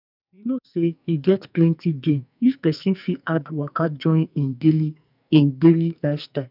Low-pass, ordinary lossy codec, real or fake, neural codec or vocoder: 5.4 kHz; none; fake; codec, 44.1 kHz, 2.6 kbps, SNAC